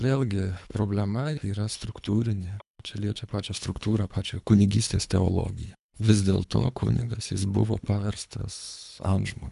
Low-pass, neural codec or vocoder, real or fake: 10.8 kHz; codec, 24 kHz, 3 kbps, HILCodec; fake